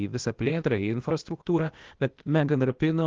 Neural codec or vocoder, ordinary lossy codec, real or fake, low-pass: codec, 16 kHz, 0.8 kbps, ZipCodec; Opus, 16 kbps; fake; 7.2 kHz